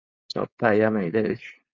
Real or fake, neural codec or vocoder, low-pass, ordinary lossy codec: fake; codec, 16 kHz, 4.8 kbps, FACodec; 7.2 kHz; Opus, 64 kbps